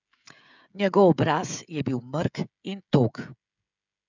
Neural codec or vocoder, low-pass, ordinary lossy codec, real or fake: codec, 16 kHz, 16 kbps, FreqCodec, smaller model; 7.2 kHz; none; fake